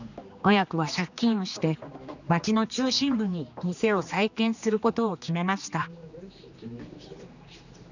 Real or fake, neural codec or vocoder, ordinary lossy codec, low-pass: fake; codec, 16 kHz, 2 kbps, X-Codec, HuBERT features, trained on general audio; none; 7.2 kHz